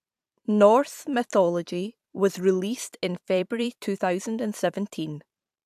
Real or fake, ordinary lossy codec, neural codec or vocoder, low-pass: fake; AAC, 96 kbps; vocoder, 44.1 kHz, 128 mel bands every 512 samples, BigVGAN v2; 14.4 kHz